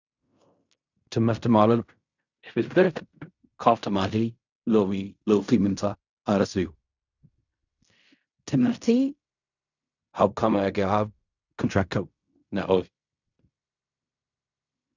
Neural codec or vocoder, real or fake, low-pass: codec, 16 kHz in and 24 kHz out, 0.4 kbps, LongCat-Audio-Codec, fine tuned four codebook decoder; fake; 7.2 kHz